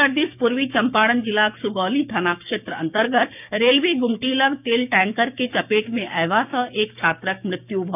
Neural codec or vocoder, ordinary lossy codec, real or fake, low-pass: codec, 16 kHz, 6 kbps, DAC; none; fake; 3.6 kHz